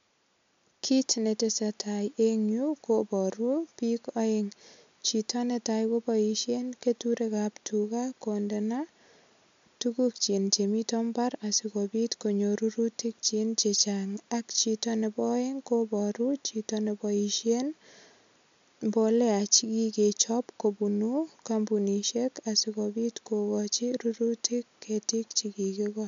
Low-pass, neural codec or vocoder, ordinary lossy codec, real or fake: 7.2 kHz; none; MP3, 64 kbps; real